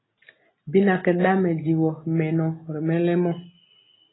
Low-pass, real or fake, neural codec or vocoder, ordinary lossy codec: 7.2 kHz; real; none; AAC, 16 kbps